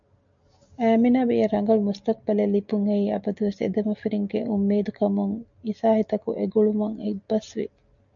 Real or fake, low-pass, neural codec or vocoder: real; 7.2 kHz; none